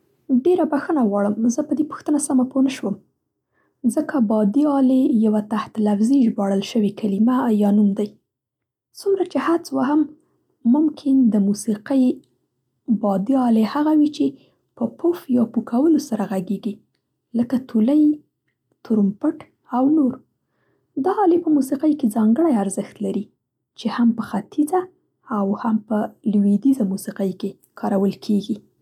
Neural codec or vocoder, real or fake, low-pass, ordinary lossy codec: none; real; 19.8 kHz; none